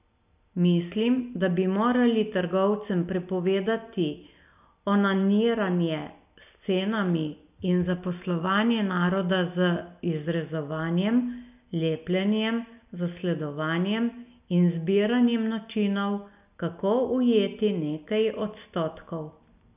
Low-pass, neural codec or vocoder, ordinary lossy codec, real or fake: 3.6 kHz; none; none; real